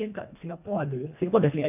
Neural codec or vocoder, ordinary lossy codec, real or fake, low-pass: codec, 24 kHz, 1.5 kbps, HILCodec; none; fake; 3.6 kHz